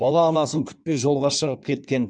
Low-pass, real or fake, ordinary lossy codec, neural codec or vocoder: 9.9 kHz; fake; none; codec, 16 kHz in and 24 kHz out, 1.1 kbps, FireRedTTS-2 codec